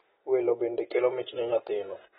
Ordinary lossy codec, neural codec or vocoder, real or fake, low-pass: AAC, 16 kbps; none; real; 19.8 kHz